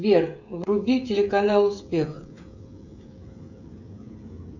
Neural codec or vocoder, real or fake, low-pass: codec, 16 kHz, 16 kbps, FreqCodec, smaller model; fake; 7.2 kHz